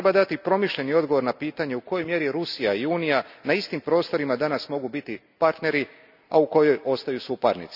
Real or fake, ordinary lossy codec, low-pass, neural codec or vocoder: real; none; 5.4 kHz; none